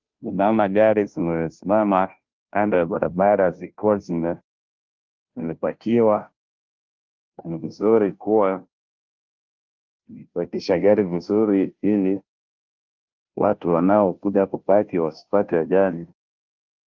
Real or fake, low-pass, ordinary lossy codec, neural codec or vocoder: fake; 7.2 kHz; Opus, 24 kbps; codec, 16 kHz, 0.5 kbps, FunCodec, trained on Chinese and English, 25 frames a second